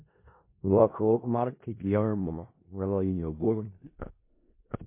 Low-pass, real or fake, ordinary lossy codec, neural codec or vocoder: 3.6 kHz; fake; MP3, 24 kbps; codec, 16 kHz in and 24 kHz out, 0.4 kbps, LongCat-Audio-Codec, four codebook decoder